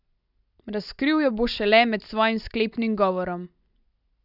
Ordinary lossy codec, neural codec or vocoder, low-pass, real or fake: none; none; 5.4 kHz; real